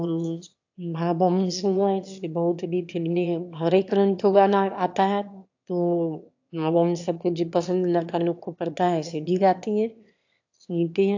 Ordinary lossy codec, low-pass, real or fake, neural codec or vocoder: AAC, 48 kbps; 7.2 kHz; fake; autoencoder, 22.05 kHz, a latent of 192 numbers a frame, VITS, trained on one speaker